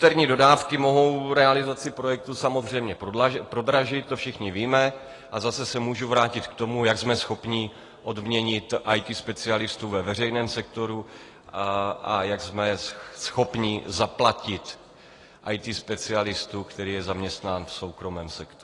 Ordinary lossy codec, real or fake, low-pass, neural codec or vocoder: AAC, 32 kbps; real; 10.8 kHz; none